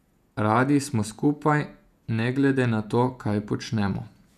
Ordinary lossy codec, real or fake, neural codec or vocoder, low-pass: none; fake; vocoder, 48 kHz, 128 mel bands, Vocos; 14.4 kHz